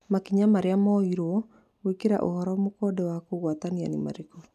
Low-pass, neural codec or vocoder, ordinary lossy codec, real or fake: 14.4 kHz; none; none; real